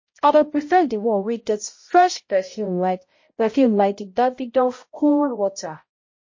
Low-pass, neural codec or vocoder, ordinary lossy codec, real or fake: 7.2 kHz; codec, 16 kHz, 0.5 kbps, X-Codec, HuBERT features, trained on balanced general audio; MP3, 32 kbps; fake